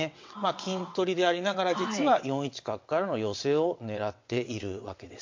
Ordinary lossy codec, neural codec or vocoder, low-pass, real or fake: MP3, 48 kbps; vocoder, 22.05 kHz, 80 mel bands, WaveNeXt; 7.2 kHz; fake